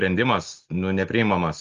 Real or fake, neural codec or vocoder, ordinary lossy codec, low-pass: real; none; Opus, 24 kbps; 7.2 kHz